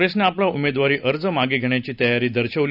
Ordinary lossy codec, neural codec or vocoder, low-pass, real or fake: none; none; 5.4 kHz; real